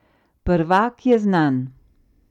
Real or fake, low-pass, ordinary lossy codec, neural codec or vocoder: real; 19.8 kHz; none; none